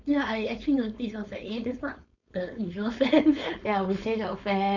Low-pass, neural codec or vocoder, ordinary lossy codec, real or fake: 7.2 kHz; codec, 16 kHz, 4.8 kbps, FACodec; none; fake